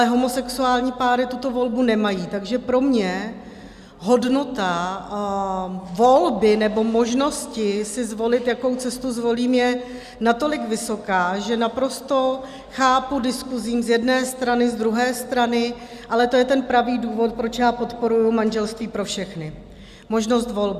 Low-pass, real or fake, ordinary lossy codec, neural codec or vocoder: 14.4 kHz; real; Opus, 64 kbps; none